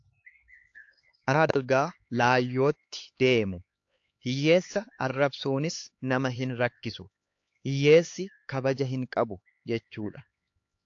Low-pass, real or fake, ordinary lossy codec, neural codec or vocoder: 7.2 kHz; fake; AAC, 48 kbps; codec, 16 kHz, 4 kbps, X-Codec, HuBERT features, trained on LibriSpeech